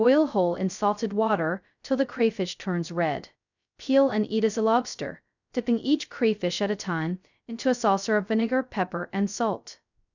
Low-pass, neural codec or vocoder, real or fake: 7.2 kHz; codec, 16 kHz, 0.2 kbps, FocalCodec; fake